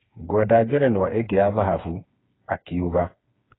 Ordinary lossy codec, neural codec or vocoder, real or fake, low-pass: AAC, 16 kbps; codec, 16 kHz, 4 kbps, FreqCodec, smaller model; fake; 7.2 kHz